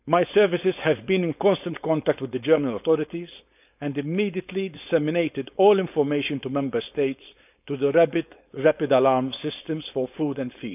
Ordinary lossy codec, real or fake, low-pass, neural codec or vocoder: none; fake; 3.6 kHz; codec, 16 kHz, 4.8 kbps, FACodec